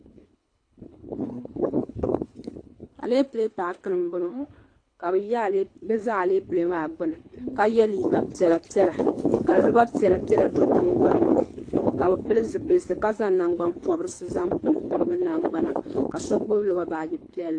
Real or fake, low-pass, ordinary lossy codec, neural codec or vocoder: fake; 9.9 kHz; AAC, 48 kbps; codec, 24 kHz, 3 kbps, HILCodec